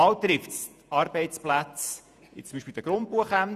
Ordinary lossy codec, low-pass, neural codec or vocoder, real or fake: none; 14.4 kHz; vocoder, 48 kHz, 128 mel bands, Vocos; fake